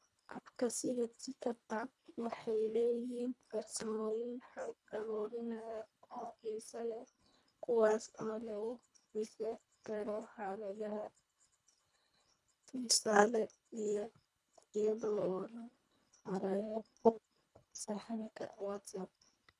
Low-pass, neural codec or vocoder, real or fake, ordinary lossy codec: none; codec, 24 kHz, 1.5 kbps, HILCodec; fake; none